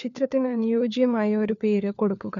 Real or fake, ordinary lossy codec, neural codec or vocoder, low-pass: fake; none; codec, 16 kHz, 4 kbps, FunCodec, trained on LibriTTS, 50 frames a second; 7.2 kHz